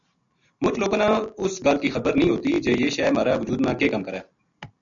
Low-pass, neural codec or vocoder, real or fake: 7.2 kHz; none; real